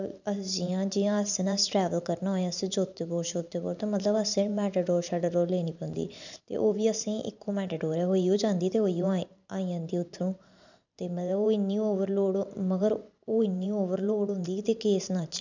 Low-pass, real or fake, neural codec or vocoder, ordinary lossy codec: 7.2 kHz; fake; vocoder, 44.1 kHz, 128 mel bands every 512 samples, BigVGAN v2; none